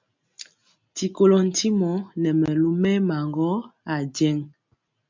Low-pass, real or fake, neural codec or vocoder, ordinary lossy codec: 7.2 kHz; real; none; MP3, 64 kbps